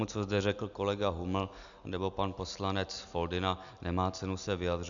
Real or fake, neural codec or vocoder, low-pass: real; none; 7.2 kHz